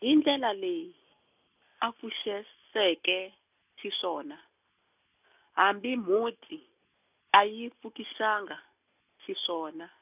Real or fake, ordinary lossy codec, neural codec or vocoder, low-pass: real; none; none; 3.6 kHz